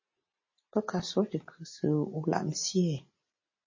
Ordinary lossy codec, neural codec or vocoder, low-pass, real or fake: MP3, 32 kbps; none; 7.2 kHz; real